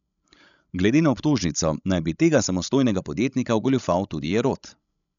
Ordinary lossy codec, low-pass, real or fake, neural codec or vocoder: none; 7.2 kHz; fake; codec, 16 kHz, 16 kbps, FreqCodec, larger model